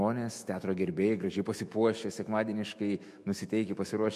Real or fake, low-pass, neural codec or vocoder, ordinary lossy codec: fake; 14.4 kHz; autoencoder, 48 kHz, 128 numbers a frame, DAC-VAE, trained on Japanese speech; MP3, 64 kbps